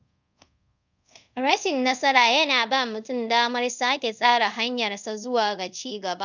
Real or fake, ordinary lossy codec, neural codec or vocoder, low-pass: fake; none; codec, 24 kHz, 0.5 kbps, DualCodec; 7.2 kHz